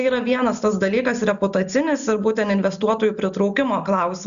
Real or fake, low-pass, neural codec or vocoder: real; 7.2 kHz; none